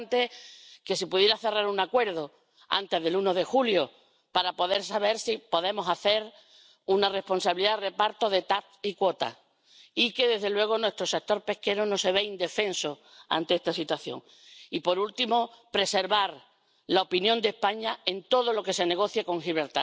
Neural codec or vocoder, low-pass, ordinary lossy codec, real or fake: none; none; none; real